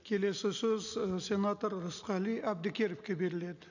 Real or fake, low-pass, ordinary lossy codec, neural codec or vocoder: real; 7.2 kHz; none; none